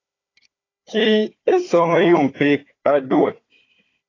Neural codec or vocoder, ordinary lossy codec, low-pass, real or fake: codec, 16 kHz, 4 kbps, FunCodec, trained on Chinese and English, 50 frames a second; AAC, 32 kbps; 7.2 kHz; fake